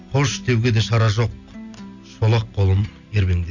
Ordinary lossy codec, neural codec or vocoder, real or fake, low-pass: none; none; real; 7.2 kHz